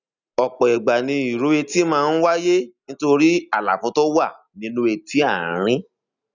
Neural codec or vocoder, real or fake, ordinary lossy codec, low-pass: none; real; none; 7.2 kHz